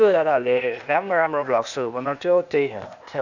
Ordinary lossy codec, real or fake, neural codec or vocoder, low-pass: none; fake; codec, 16 kHz, 0.8 kbps, ZipCodec; 7.2 kHz